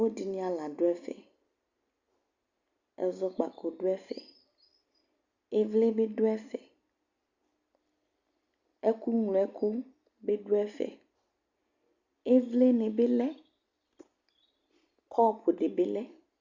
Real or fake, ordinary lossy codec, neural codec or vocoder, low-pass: real; Opus, 64 kbps; none; 7.2 kHz